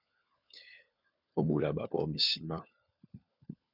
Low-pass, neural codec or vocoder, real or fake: 5.4 kHz; codec, 16 kHz, 4 kbps, FunCodec, trained on LibriTTS, 50 frames a second; fake